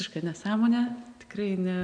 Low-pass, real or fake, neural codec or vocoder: 9.9 kHz; real; none